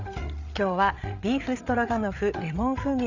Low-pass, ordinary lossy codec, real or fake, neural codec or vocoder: 7.2 kHz; none; fake; codec, 16 kHz, 8 kbps, FreqCodec, larger model